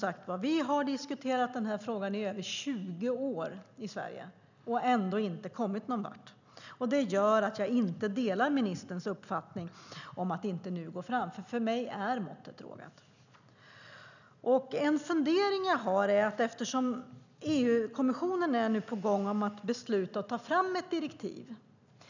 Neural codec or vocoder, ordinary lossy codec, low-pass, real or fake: none; none; 7.2 kHz; real